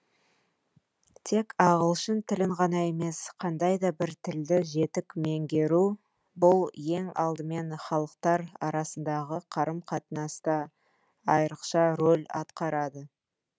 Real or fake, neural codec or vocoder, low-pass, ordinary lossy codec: real; none; none; none